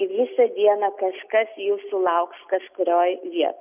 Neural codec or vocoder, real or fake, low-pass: none; real; 3.6 kHz